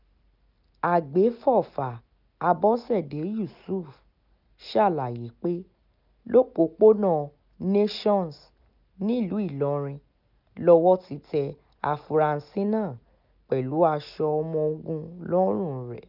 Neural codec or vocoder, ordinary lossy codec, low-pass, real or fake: none; none; 5.4 kHz; real